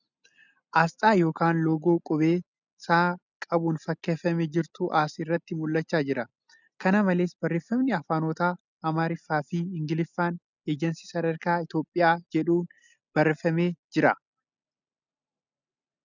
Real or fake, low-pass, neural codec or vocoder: real; 7.2 kHz; none